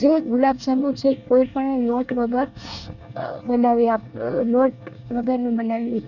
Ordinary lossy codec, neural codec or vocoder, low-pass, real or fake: none; codec, 24 kHz, 1 kbps, SNAC; 7.2 kHz; fake